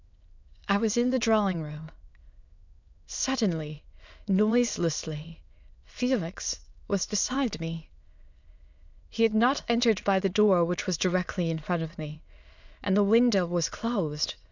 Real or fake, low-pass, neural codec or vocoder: fake; 7.2 kHz; autoencoder, 22.05 kHz, a latent of 192 numbers a frame, VITS, trained on many speakers